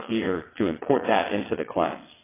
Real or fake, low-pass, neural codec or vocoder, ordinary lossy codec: fake; 3.6 kHz; vocoder, 22.05 kHz, 80 mel bands, WaveNeXt; AAC, 16 kbps